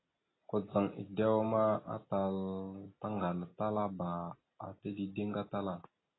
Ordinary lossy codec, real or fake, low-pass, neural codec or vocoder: AAC, 16 kbps; real; 7.2 kHz; none